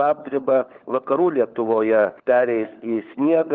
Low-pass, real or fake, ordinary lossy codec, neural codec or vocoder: 7.2 kHz; fake; Opus, 32 kbps; codec, 16 kHz, 4 kbps, FunCodec, trained on LibriTTS, 50 frames a second